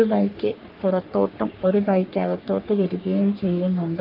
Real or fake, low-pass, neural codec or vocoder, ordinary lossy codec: fake; 5.4 kHz; codec, 44.1 kHz, 3.4 kbps, Pupu-Codec; Opus, 32 kbps